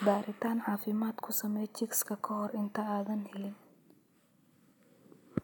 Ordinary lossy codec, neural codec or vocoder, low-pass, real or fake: none; none; none; real